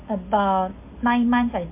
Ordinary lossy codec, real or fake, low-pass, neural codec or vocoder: MP3, 24 kbps; real; 3.6 kHz; none